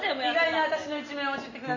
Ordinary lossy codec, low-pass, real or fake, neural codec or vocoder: AAC, 32 kbps; 7.2 kHz; real; none